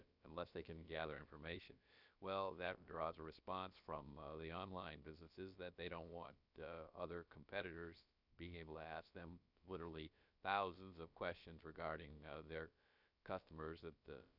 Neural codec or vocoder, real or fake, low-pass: codec, 16 kHz, about 1 kbps, DyCAST, with the encoder's durations; fake; 5.4 kHz